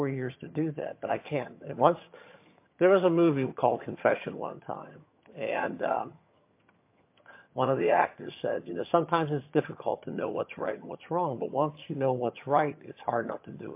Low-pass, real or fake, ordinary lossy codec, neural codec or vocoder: 3.6 kHz; fake; MP3, 24 kbps; vocoder, 22.05 kHz, 80 mel bands, HiFi-GAN